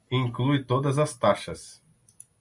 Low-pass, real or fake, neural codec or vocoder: 10.8 kHz; real; none